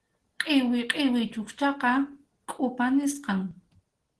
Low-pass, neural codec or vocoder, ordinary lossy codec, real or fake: 10.8 kHz; none; Opus, 16 kbps; real